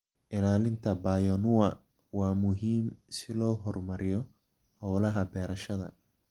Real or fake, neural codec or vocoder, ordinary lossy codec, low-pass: real; none; Opus, 24 kbps; 19.8 kHz